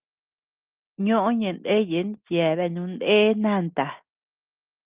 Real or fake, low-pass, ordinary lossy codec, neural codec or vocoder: real; 3.6 kHz; Opus, 32 kbps; none